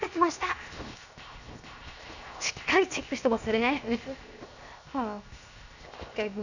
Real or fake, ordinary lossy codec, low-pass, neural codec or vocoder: fake; none; 7.2 kHz; codec, 16 kHz, 0.7 kbps, FocalCodec